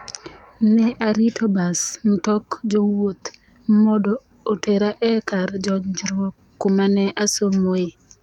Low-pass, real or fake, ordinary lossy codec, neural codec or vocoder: 19.8 kHz; fake; none; codec, 44.1 kHz, 7.8 kbps, DAC